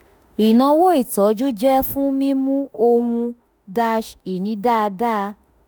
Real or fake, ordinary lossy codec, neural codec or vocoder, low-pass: fake; none; autoencoder, 48 kHz, 32 numbers a frame, DAC-VAE, trained on Japanese speech; none